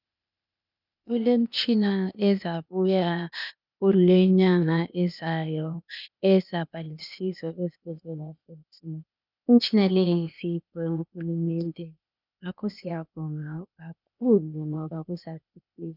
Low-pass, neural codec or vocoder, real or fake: 5.4 kHz; codec, 16 kHz, 0.8 kbps, ZipCodec; fake